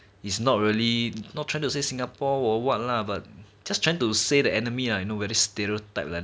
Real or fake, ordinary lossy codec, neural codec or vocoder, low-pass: real; none; none; none